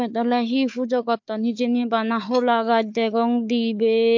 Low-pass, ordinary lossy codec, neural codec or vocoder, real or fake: 7.2 kHz; MP3, 48 kbps; codec, 16 kHz, 4 kbps, FunCodec, trained on Chinese and English, 50 frames a second; fake